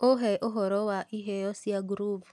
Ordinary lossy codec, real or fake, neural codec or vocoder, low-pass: none; real; none; none